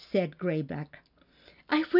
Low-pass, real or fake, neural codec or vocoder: 5.4 kHz; real; none